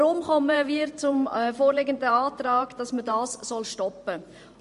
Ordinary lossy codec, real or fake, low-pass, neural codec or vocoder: MP3, 48 kbps; fake; 14.4 kHz; vocoder, 44.1 kHz, 128 mel bands every 512 samples, BigVGAN v2